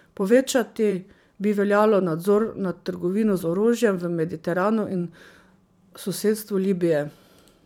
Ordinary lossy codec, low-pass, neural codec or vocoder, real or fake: none; 19.8 kHz; vocoder, 44.1 kHz, 128 mel bands every 512 samples, BigVGAN v2; fake